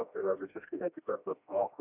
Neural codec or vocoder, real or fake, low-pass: codec, 16 kHz, 1 kbps, FreqCodec, smaller model; fake; 3.6 kHz